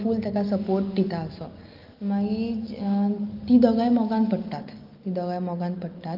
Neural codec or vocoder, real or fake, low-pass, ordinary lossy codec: none; real; 5.4 kHz; Opus, 32 kbps